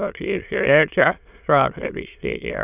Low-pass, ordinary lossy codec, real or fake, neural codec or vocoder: 3.6 kHz; none; fake; autoencoder, 22.05 kHz, a latent of 192 numbers a frame, VITS, trained on many speakers